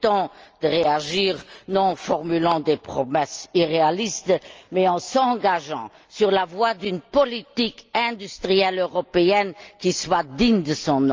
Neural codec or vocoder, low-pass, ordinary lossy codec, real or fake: none; 7.2 kHz; Opus, 24 kbps; real